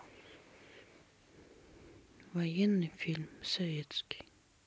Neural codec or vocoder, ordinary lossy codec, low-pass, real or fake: none; none; none; real